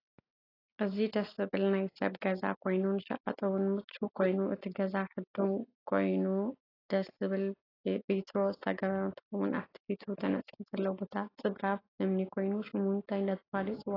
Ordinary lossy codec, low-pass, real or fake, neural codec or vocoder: AAC, 24 kbps; 5.4 kHz; real; none